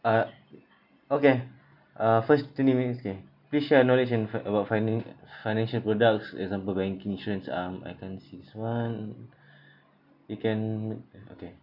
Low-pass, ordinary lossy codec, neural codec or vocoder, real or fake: 5.4 kHz; none; none; real